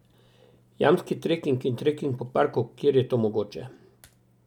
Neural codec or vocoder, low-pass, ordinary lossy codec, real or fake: none; 19.8 kHz; none; real